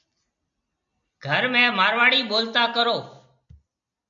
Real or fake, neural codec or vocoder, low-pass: real; none; 7.2 kHz